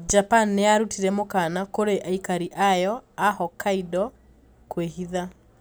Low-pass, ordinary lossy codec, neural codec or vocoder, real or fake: none; none; none; real